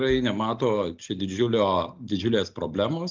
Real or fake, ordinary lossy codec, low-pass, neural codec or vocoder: real; Opus, 24 kbps; 7.2 kHz; none